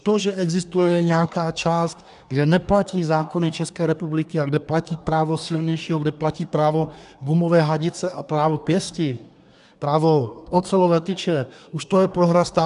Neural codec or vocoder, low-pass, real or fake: codec, 24 kHz, 1 kbps, SNAC; 10.8 kHz; fake